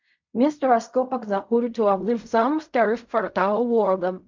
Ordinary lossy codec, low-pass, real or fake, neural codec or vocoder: MP3, 64 kbps; 7.2 kHz; fake; codec, 16 kHz in and 24 kHz out, 0.4 kbps, LongCat-Audio-Codec, fine tuned four codebook decoder